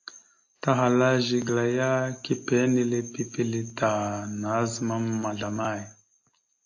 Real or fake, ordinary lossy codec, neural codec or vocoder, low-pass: real; AAC, 48 kbps; none; 7.2 kHz